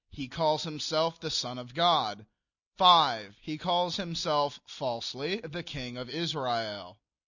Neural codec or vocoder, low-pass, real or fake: none; 7.2 kHz; real